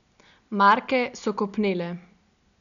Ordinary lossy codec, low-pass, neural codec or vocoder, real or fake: none; 7.2 kHz; none; real